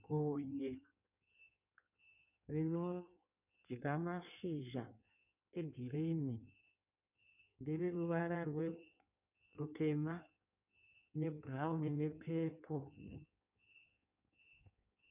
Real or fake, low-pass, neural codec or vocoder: fake; 3.6 kHz; codec, 16 kHz in and 24 kHz out, 1.1 kbps, FireRedTTS-2 codec